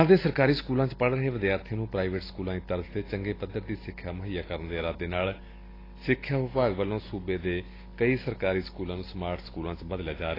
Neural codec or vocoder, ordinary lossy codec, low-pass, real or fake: none; AAC, 24 kbps; 5.4 kHz; real